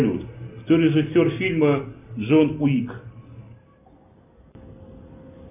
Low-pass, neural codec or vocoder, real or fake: 3.6 kHz; none; real